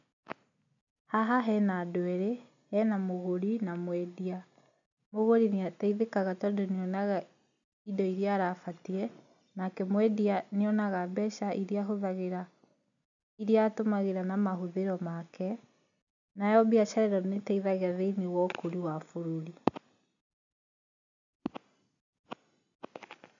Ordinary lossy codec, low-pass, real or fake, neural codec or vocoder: none; 7.2 kHz; real; none